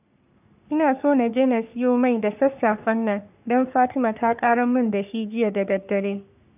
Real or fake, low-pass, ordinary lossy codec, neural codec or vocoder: fake; 3.6 kHz; none; codec, 44.1 kHz, 3.4 kbps, Pupu-Codec